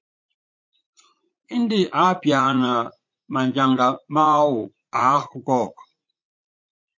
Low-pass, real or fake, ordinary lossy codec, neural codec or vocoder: 7.2 kHz; fake; MP3, 48 kbps; vocoder, 44.1 kHz, 80 mel bands, Vocos